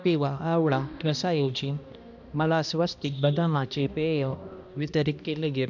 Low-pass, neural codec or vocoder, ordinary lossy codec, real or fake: 7.2 kHz; codec, 16 kHz, 1 kbps, X-Codec, HuBERT features, trained on balanced general audio; none; fake